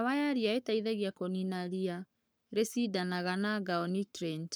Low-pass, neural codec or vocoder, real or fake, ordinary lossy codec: none; codec, 44.1 kHz, 7.8 kbps, Pupu-Codec; fake; none